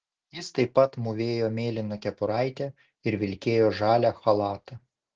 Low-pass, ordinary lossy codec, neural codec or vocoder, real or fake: 7.2 kHz; Opus, 16 kbps; none; real